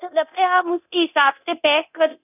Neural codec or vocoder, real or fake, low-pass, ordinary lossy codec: codec, 24 kHz, 0.9 kbps, DualCodec; fake; 3.6 kHz; none